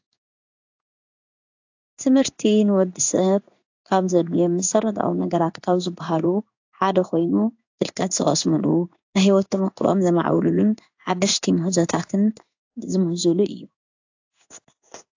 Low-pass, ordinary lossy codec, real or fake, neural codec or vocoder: 7.2 kHz; AAC, 48 kbps; fake; codec, 16 kHz in and 24 kHz out, 1 kbps, XY-Tokenizer